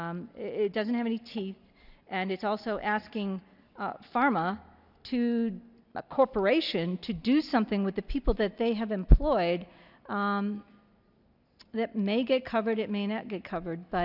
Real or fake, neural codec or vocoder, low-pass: real; none; 5.4 kHz